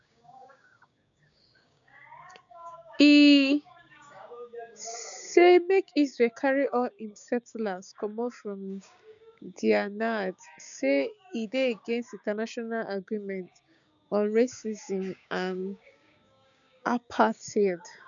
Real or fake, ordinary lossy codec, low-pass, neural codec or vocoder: fake; none; 7.2 kHz; codec, 16 kHz, 6 kbps, DAC